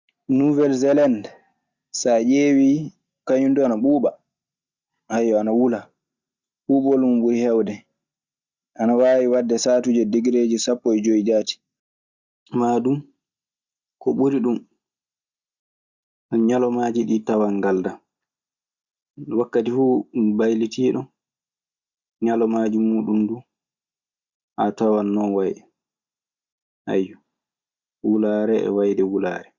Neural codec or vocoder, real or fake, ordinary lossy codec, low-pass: none; real; Opus, 64 kbps; 7.2 kHz